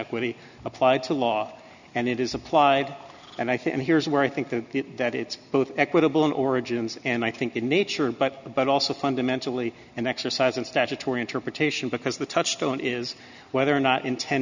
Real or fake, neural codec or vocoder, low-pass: real; none; 7.2 kHz